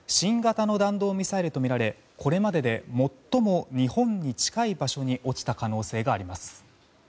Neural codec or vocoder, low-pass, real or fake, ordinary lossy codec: none; none; real; none